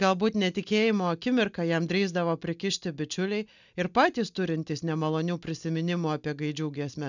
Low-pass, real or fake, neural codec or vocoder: 7.2 kHz; real; none